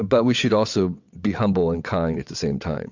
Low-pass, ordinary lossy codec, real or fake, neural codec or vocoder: 7.2 kHz; MP3, 64 kbps; fake; vocoder, 22.05 kHz, 80 mel bands, WaveNeXt